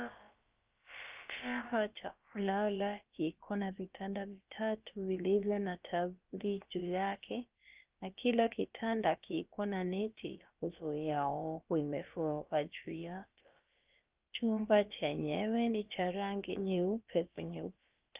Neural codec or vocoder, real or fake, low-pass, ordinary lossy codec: codec, 16 kHz, about 1 kbps, DyCAST, with the encoder's durations; fake; 3.6 kHz; Opus, 24 kbps